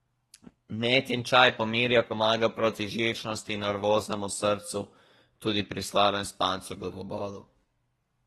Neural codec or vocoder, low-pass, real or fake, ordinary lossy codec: codec, 32 kHz, 1.9 kbps, SNAC; 14.4 kHz; fake; AAC, 32 kbps